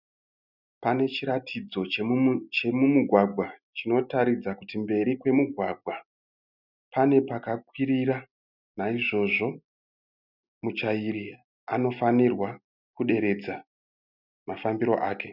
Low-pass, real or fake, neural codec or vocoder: 5.4 kHz; real; none